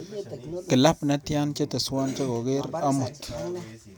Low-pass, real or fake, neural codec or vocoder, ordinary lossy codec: none; real; none; none